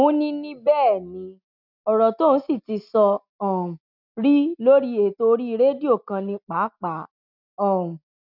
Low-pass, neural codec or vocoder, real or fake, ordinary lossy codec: 5.4 kHz; none; real; none